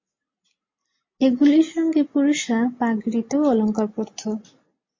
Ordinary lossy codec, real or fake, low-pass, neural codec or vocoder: MP3, 32 kbps; real; 7.2 kHz; none